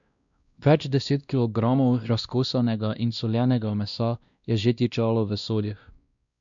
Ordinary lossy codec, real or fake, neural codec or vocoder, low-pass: MP3, 96 kbps; fake; codec, 16 kHz, 1 kbps, X-Codec, WavLM features, trained on Multilingual LibriSpeech; 7.2 kHz